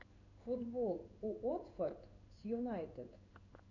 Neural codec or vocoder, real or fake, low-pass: codec, 16 kHz in and 24 kHz out, 1 kbps, XY-Tokenizer; fake; 7.2 kHz